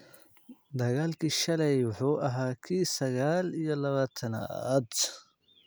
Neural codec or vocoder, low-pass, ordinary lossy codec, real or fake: none; none; none; real